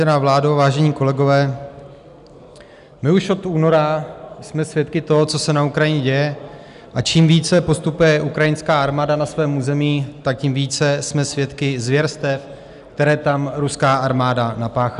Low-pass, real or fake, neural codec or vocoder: 10.8 kHz; real; none